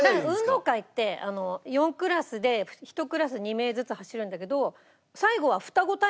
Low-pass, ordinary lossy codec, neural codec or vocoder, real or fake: none; none; none; real